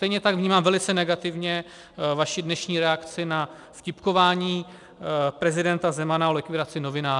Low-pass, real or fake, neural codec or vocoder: 10.8 kHz; real; none